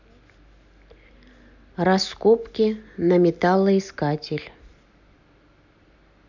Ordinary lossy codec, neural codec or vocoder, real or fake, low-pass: none; none; real; 7.2 kHz